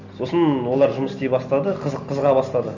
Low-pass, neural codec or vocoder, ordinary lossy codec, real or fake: 7.2 kHz; none; none; real